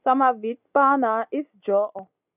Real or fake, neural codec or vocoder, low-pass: real; none; 3.6 kHz